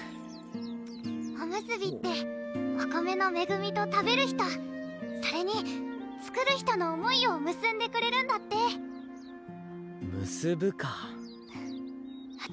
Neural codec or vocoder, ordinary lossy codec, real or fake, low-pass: none; none; real; none